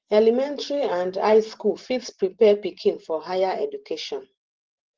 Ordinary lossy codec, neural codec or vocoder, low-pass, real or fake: Opus, 16 kbps; vocoder, 44.1 kHz, 128 mel bands every 512 samples, BigVGAN v2; 7.2 kHz; fake